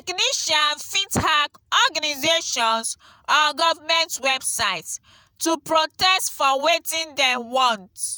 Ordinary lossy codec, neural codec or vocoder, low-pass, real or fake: none; vocoder, 48 kHz, 128 mel bands, Vocos; none; fake